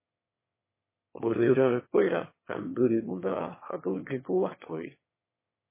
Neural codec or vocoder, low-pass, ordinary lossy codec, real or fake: autoencoder, 22.05 kHz, a latent of 192 numbers a frame, VITS, trained on one speaker; 3.6 kHz; MP3, 16 kbps; fake